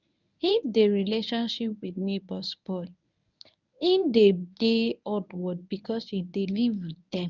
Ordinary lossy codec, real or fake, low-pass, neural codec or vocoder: none; fake; 7.2 kHz; codec, 24 kHz, 0.9 kbps, WavTokenizer, medium speech release version 1